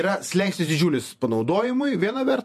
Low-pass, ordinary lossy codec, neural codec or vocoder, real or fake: 14.4 kHz; MP3, 64 kbps; vocoder, 48 kHz, 128 mel bands, Vocos; fake